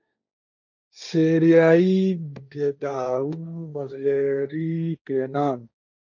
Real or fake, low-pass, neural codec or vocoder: fake; 7.2 kHz; codec, 16 kHz, 1.1 kbps, Voila-Tokenizer